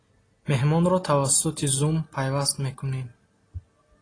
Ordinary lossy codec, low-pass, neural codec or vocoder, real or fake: AAC, 32 kbps; 9.9 kHz; none; real